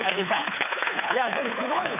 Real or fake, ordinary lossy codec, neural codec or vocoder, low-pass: fake; none; codec, 24 kHz, 3 kbps, HILCodec; 3.6 kHz